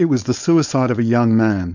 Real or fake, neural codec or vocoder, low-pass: fake; codec, 16 kHz, 4.8 kbps, FACodec; 7.2 kHz